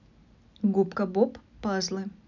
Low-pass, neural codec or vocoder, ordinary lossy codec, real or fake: 7.2 kHz; none; none; real